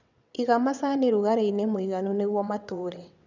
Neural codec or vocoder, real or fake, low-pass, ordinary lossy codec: vocoder, 22.05 kHz, 80 mel bands, WaveNeXt; fake; 7.2 kHz; none